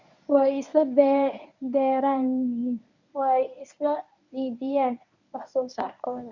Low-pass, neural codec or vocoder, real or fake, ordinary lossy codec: 7.2 kHz; codec, 24 kHz, 0.9 kbps, WavTokenizer, medium speech release version 1; fake; none